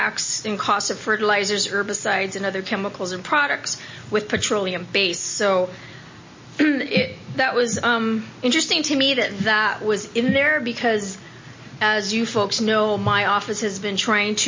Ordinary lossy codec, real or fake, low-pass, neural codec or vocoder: MP3, 32 kbps; real; 7.2 kHz; none